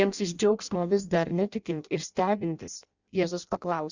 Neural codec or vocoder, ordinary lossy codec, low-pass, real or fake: codec, 16 kHz in and 24 kHz out, 0.6 kbps, FireRedTTS-2 codec; Opus, 64 kbps; 7.2 kHz; fake